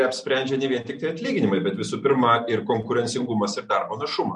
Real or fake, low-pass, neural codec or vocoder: real; 10.8 kHz; none